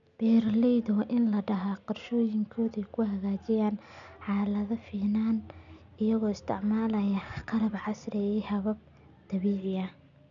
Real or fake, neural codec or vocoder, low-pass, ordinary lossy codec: real; none; 7.2 kHz; none